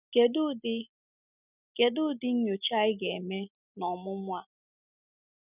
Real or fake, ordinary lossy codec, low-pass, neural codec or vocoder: real; none; 3.6 kHz; none